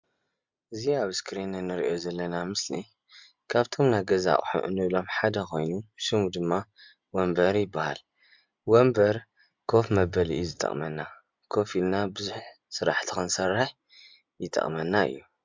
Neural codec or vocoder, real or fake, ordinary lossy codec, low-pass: none; real; MP3, 64 kbps; 7.2 kHz